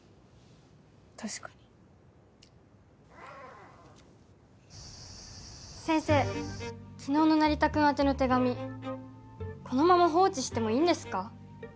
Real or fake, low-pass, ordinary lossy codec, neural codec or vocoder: real; none; none; none